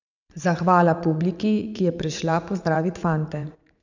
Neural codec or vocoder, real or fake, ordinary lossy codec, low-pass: vocoder, 44.1 kHz, 80 mel bands, Vocos; fake; none; 7.2 kHz